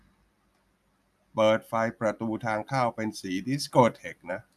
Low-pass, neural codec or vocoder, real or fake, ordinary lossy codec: 14.4 kHz; none; real; none